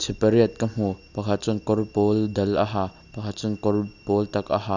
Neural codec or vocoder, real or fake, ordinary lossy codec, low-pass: none; real; none; 7.2 kHz